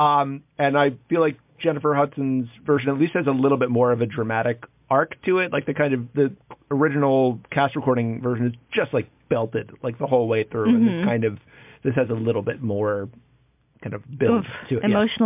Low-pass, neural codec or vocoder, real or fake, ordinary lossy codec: 3.6 kHz; none; real; MP3, 32 kbps